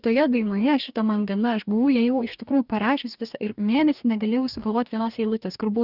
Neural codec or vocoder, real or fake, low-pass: codec, 44.1 kHz, 2.6 kbps, DAC; fake; 5.4 kHz